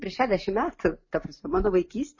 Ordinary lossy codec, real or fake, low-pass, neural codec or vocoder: MP3, 32 kbps; real; 7.2 kHz; none